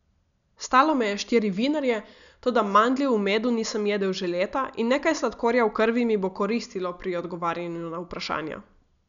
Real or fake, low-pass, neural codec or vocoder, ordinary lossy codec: real; 7.2 kHz; none; none